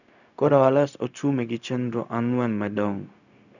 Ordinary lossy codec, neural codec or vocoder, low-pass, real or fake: none; codec, 16 kHz, 0.4 kbps, LongCat-Audio-Codec; 7.2 kHz; fake